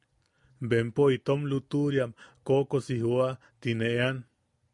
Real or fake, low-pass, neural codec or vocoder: real; 10.8 kHz; none